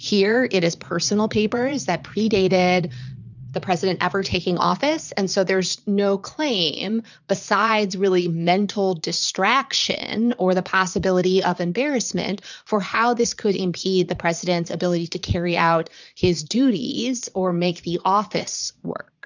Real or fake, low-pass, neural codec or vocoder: fake; 7.2 kHz; vocoder, 22.05 kHz, 80 mel bands, Vocos